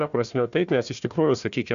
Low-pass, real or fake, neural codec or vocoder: 7.2 kHz; fake; codec, 16 kHz, 1 kbps, FunCodec, trained on Chinese and English, 50 frames a second